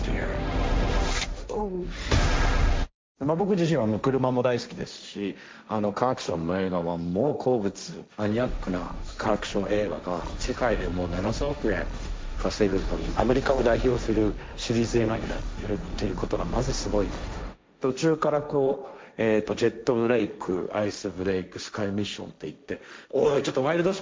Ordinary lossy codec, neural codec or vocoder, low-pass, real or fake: none; codec, 16 kHz, 1.1 kbps, Voila-Tokenizer; none; fake